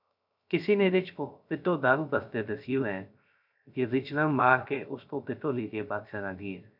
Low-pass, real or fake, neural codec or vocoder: 5.4 kHz; fake; codec, 16 kHz, 0.3 kbps, FocalCodec